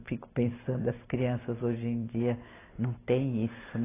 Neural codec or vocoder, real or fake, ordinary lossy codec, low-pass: none; real; AAC, 16 kbps; 3.6 kHz